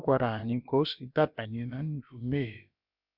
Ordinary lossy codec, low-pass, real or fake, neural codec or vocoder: none; 5.4 kHz; fake; codec, 16 kHz, about 1 kbps, DyCAST, with the encoder's durations